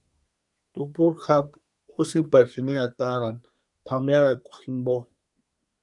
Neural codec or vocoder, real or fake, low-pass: codec, 24 kHz, 1 kbps, SNAC; fake; 10.8 kHz